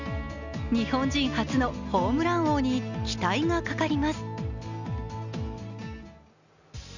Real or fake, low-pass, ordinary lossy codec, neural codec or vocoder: real; 7.2 kHz; none; none